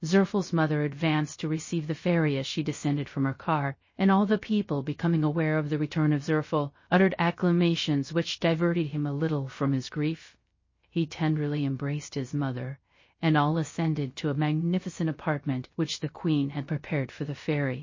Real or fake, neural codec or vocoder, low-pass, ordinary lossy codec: fake; codec, 16 kHz, 0.3 kbps, FocalCodec; 7.2 kHz; MP3, 32 kbps